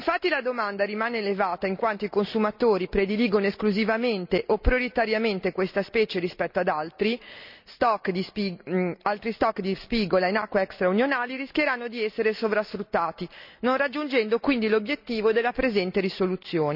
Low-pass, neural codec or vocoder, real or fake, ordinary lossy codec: 5.4 kHz; none; real; none